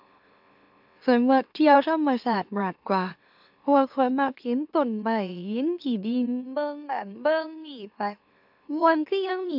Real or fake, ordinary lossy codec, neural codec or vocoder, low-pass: fake; AAC, 48 kbps; autoencoder, 44.1 kHz, a latent of 192 numbers a frame, MeloTTS; 5.4 kHz